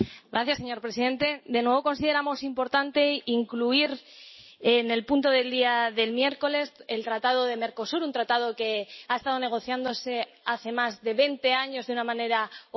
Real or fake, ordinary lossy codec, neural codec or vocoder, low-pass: real; MP3, 24 kbps; none; 7.2 kHz